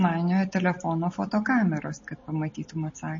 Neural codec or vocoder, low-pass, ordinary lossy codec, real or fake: none; 7.2 kHz; MP3, 32 kbps; real